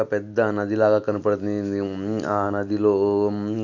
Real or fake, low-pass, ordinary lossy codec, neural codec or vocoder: real; 7.2 kHz; none; none